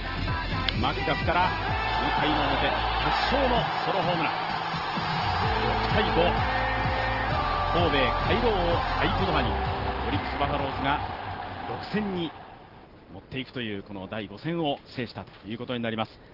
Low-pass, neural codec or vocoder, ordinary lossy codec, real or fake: 5.4 kHz; none; Opus, 16 kbps; real